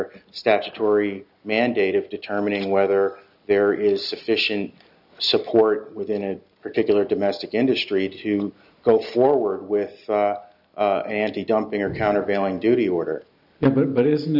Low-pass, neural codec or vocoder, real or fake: 5.4 kHz; none; real